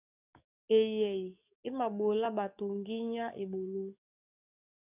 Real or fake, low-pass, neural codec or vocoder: fake; 3.6 kHz; codec, 44.1 kHz, 7.8 kbps, DAC